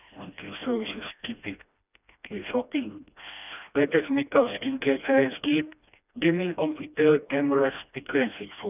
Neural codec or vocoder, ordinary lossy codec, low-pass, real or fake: codec, 16 kHz, 1 kbps, FreqCodec, smaller model; none; 3.6 kHz; fake